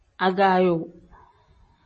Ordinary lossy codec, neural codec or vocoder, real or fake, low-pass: MP3, 32 kbps; none; real; 9.9 kHz